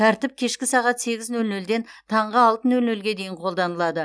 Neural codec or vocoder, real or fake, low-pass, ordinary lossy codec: none; real; none; none